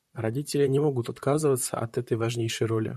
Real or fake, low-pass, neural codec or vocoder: fake; 14.4 kHz; vocoder, 44.1 kHz, 128 mel bands, Pupu-Vocoder